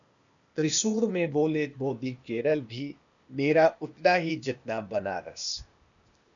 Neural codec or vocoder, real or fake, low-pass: codec, 16 kHz, 0.8 kbps, ZipCodec; fake; 7.2 kHz